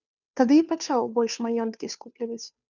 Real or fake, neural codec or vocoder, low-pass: fake; codec, 16 kHz, 2 kbps, FunCodec, trained on Chinese and English, 25 frames a second; 7.2 kHz